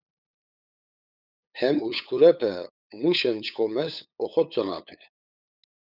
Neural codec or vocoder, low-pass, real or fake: codec, 16 kHz, 8 kbps, FunCodec, trained on LibriTTS, 25 frames a second; 5.4 kHz; fake